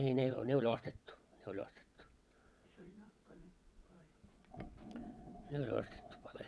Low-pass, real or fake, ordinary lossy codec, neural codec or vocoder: none; fake; none; vocoder, 22.05 kHz, 80 mel bands, WaveNeXt